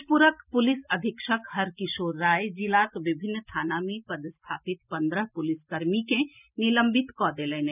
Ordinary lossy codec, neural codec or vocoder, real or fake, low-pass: none; none; real; 3.6 kHz